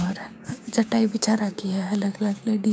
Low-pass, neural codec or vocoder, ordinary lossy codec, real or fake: none; codec, 16 kHz, 6 kbps, DAC; none; fake